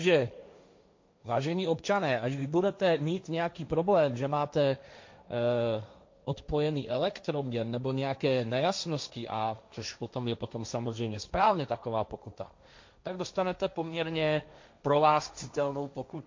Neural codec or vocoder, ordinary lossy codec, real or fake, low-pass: codec, 16 kHz, 1.1 kbps, Voila-Tokenizer; MP3, 48 kbps; fake; 7.2 kHz